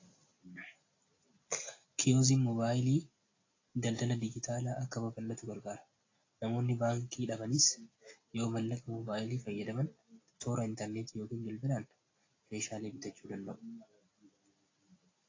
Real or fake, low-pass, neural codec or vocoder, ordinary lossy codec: real; 7.2 kHz; none; AAC, 32 kbps